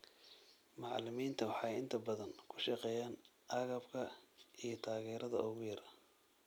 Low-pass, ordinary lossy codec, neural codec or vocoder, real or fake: none; none; none; real